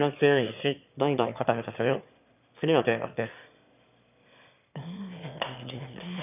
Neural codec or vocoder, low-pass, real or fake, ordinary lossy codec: autoencoder, 22.05 kHz, a latent of 192 numbers a frame, VITS, trained on one speaker; 3.6 kHz; fake; none